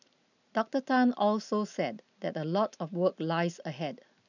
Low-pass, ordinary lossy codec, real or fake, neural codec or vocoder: 7.2 kHz; none; real; none